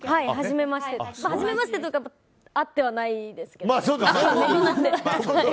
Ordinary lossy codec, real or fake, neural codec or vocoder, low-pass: none; real; none; none